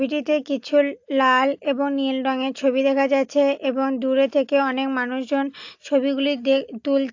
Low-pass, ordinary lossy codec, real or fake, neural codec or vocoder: 7.2 kHz; AAC, 48 kbps; real; none